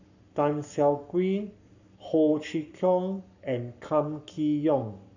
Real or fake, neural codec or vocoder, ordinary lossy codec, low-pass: fake; codec, 44.1 kHz, 7.8 kbps, Pupu-Codec; none; 7.2 kHz